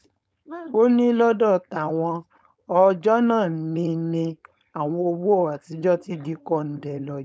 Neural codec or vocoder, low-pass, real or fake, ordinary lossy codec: codec, 16 kHz, 4.8 kbps, FACodec; none; fake; none